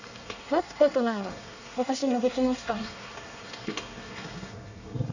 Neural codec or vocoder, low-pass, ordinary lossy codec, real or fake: codec, 24 kHz, 1 kbps, SNAC; 7.2 kHz; none; fake